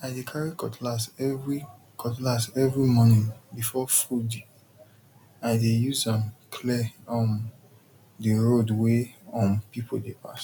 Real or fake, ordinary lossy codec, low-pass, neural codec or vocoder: real; none; 19.8 kHz; none